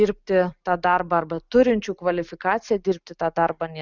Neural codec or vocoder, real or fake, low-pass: none; real; 7.2 kHz